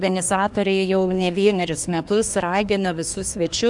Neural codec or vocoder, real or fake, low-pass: codec, 24 kHz, 1 kbps, SNAC; fake; 10.8 kHz